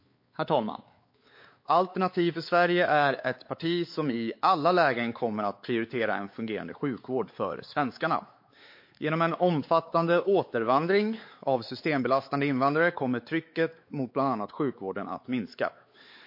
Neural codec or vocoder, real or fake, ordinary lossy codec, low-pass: codec, 16 kHz, 4 kbps, X-Codec, WavLM features, trained on Multilingual LibriSpeech; fake; MP3, 32 kbps; 5.4 kHz